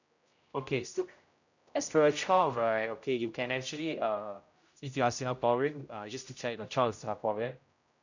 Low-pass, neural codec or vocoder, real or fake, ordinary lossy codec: 7.2 kHz; codec, 16 kHz, 0.5 kbps, X-Codec, HuBERT features, trained on general audio; fake; none